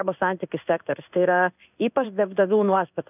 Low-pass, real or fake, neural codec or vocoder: 3.6 kHz; fake; codec, 16 kHz in and 24 kHz out, 1 kbps, XY-Tokenizer